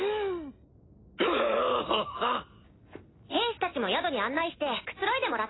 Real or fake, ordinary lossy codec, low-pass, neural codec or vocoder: real; AAC, 16 kbps; 7.2 kHz; none